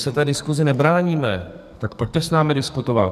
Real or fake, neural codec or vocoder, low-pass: fake; codec, 44.1 kHz, 2.6 kbps, SNAC; 14.4 kHz